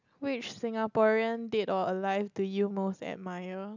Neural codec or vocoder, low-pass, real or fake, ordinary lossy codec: none; 7.2 kHz; real; none